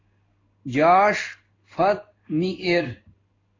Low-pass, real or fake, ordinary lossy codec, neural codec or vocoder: 7.2 kHz; fake; AAC, 32 kbps; codec, 16 kHz in and 24 kHz out, 1 kbps, XY-Tokenizer